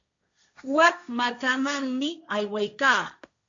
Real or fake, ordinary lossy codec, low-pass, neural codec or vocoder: fake; AAC, 64 kbps; 7.2 kHz; codec, 16 kHz, 1.1 kbps, Voila-Tokenizer